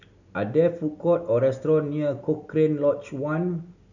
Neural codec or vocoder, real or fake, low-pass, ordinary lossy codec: none; real; 7.2 kHz; none